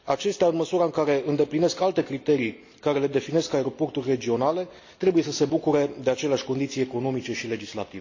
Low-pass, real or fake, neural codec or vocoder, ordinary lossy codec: 7.2 kHz; real; none; AAC, 48 kbps